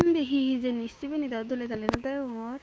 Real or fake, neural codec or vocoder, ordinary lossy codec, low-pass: fake; codec, 16 kHz, 6 kbps, DAC; none; none